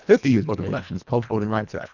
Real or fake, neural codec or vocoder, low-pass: fake; codec, 24 kHz, 1.5 kbps, HILCodec; 7.2 kHz